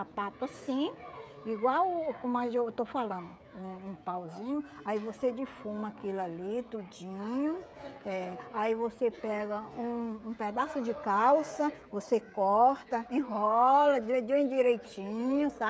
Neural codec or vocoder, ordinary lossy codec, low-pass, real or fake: codec, 16 kHz, 16 kbps, FreqCodec, smaller model; none; none; fake